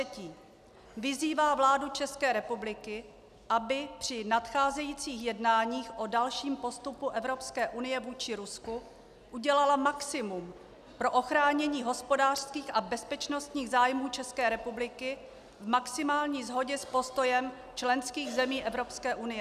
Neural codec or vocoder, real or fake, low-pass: none; real; 14.4 kHz